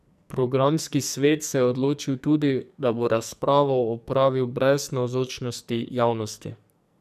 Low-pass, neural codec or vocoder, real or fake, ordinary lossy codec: 14.4 kHz; codec, 44.1 kHz, 2.6 kbps, SNAC; fake; none